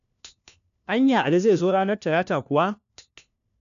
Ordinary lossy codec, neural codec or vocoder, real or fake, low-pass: none; codec, 16 kHz, 1 kbps, FunCodec, trained on LibriTTS, 50 frames a second; fake; 7.2 kHz